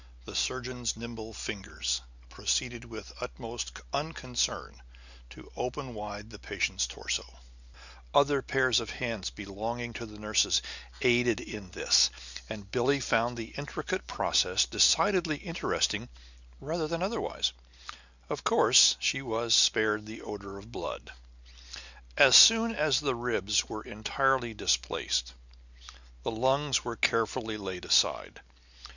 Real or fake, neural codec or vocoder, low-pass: real; none; 7.2 kHz